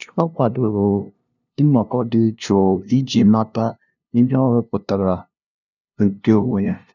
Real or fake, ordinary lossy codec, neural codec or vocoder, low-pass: fake; none; codec, 16 kHz, 0.5 kbps, FunCodec, trained on LibriTTS, 25 frames a second; 7.2 kHz